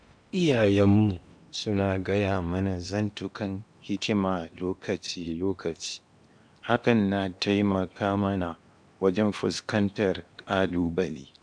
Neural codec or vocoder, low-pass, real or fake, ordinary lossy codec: codec, 16 kHz in and 24 kHz out, 0.8 kbps, FocalCodec, streaming, 65536 codes; 9.9 kHz; fake; none